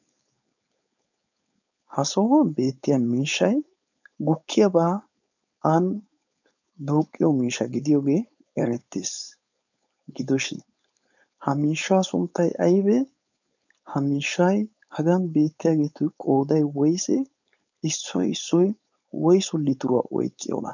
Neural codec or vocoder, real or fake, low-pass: codec, 16 kHz, 4.8 kbps, FACodec; fake; 7.2 kHz